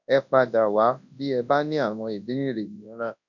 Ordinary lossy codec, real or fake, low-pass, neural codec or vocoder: none; fake; 7.2 kHz; codec, 24 kHz, 0.9 kbps, WavTokenizer, large speech release